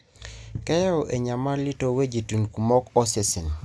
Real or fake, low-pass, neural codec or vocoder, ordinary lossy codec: real; none; none; none